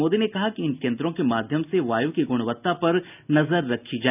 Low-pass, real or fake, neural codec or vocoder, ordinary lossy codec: 3.6 kHz; real; none; none